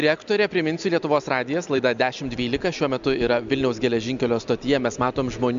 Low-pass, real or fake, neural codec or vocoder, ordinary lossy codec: 7.2 kHz; real; none; MP3, 64 kbps